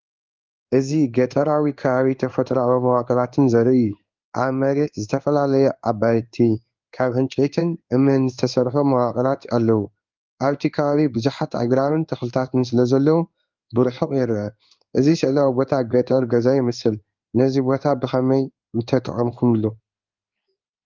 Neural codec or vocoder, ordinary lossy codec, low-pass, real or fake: codec, 16 kHz in and 24 kHz out, 1 kbps, XY-Tokenizer; Opus, 24 kbps; 7.2 kHz; fake